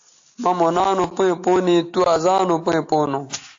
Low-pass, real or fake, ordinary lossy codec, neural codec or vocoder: 7.2 kHz; real; AAC, 48 kbps; none